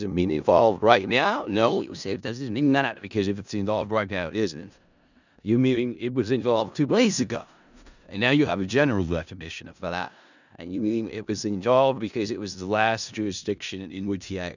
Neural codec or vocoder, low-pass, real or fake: codec, 16 kHz in and 24 kHz out, 0.4 kbps, LongCat-Audio-Codec, four codebook decoder; 7.2 kHz; fake